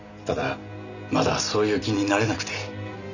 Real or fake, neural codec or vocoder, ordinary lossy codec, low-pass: real; none; none; 7.2 kHz